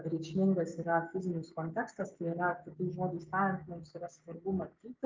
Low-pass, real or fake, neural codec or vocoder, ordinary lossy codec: 7.2 kHz; fake; codec, 16 kHz, 6 kbps, DAC; Opus, 32 kbps